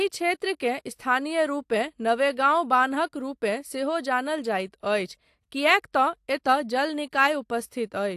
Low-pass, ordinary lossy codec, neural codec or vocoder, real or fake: 14.4 kHz; AAC, 64 kbps; none; real